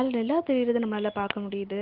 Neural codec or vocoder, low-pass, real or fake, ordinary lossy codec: none; 5.4 kHz; real; Opus, 16 kbps